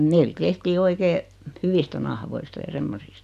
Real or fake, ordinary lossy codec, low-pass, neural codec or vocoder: real; none; 14.4 kHz; none